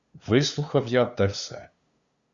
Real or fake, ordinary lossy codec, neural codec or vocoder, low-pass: fake; Opus, 64 kbps; codec, 16 kHz, 2 kbps, FunCodec, trained on LibriTTS, 25 frames a second; 7.2 kHz